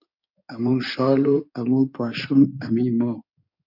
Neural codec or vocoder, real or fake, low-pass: codec, 16 kHz in and 24 kHz out, 2.2 kbps, FireRedTTS-2 codec; fake; 5.4 kHz